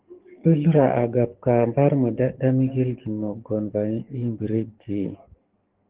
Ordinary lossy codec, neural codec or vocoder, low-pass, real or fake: Opus, 16 kbps; codec, 44.1 kHz, 7.8 kbps, DAC; 3.6 kHz; fake